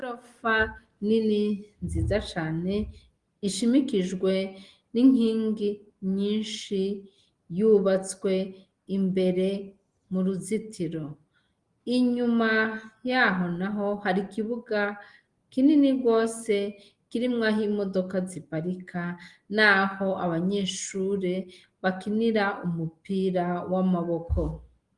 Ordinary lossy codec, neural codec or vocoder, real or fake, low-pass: Opus, 24 kbps; none; real; 10.8 kHz